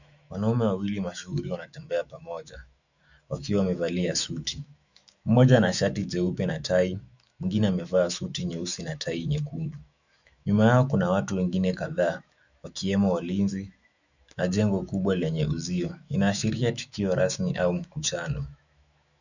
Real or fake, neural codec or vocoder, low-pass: fake; autoencoder, 48 kHz, 128 numbers a frame, DAC-VAE, trained on Japanese speech; 7.2 kHz